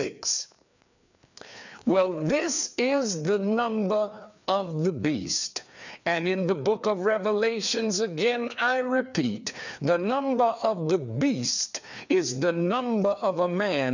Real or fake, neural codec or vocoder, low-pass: fake; codec, 16 kHz, 2 kbps, FreqCodec, larger model; 7.2 kHz